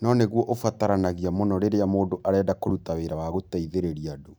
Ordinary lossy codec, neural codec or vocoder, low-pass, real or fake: none; none; none; real